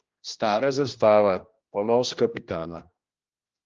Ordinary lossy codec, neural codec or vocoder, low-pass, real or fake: Opus, 24 kbps; codec, 16 kHz, 1 kbps, X-Codec, HuBERT features, trained on general audio; 7.2 kHz; fake